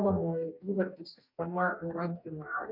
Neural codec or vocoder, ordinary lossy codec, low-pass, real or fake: codec, 44.1 kHz, 2.6 kbps, DAC; MP3, 48 kbps; 5.4 kHz; fake